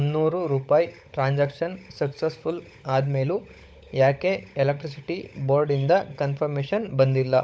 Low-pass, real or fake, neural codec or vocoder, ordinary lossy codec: none; fake; codec, 16 kHz, 16 kbps, FunCodec, trained on LibriTTS, 50 frames a second; none